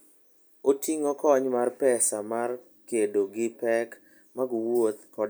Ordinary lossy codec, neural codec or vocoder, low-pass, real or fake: none; none; none; real